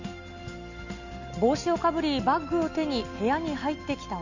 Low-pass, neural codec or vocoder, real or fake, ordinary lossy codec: 7.2 kHz; none; real; none